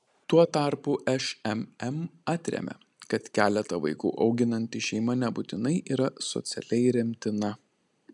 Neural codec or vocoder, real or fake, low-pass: none; real; 10.8 kHz